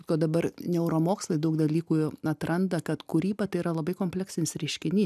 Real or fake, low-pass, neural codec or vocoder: real; 14.4 kHz; none